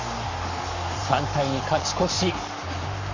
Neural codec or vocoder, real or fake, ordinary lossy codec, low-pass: codec, 44.1 kHz, 7.8 kbps, Pupu-Codec; fake; none; 7.2 kHz